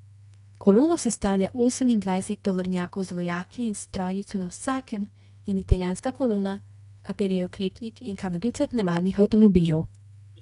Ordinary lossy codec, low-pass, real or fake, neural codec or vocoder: none; 10.8 kHz; fake; codec, 24 kHz, 0.9 kbps, WavTokenizer, medium music audio release